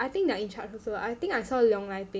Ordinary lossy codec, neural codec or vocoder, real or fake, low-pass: none; none; real; none